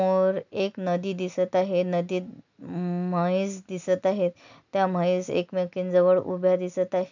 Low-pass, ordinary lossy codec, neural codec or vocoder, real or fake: 7.2 kHz; none; none; real